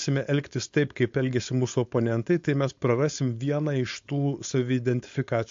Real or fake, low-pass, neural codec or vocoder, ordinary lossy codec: fake; 7.2 kHz; codec, 16 kHz, 4.8 kbps, FACodec; MP3, 48 kbps